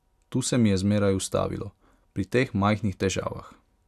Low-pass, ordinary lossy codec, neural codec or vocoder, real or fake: 14.4 kHz; none; none; real